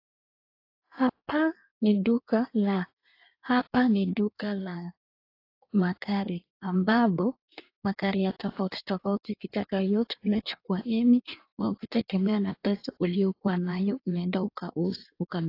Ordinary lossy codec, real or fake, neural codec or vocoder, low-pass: AAC, 32 kbps; fake; codec, 16 kHz in and 24 kHz out, 1.1 kbps, FireRedTTS-2 codec; 5.4 kHz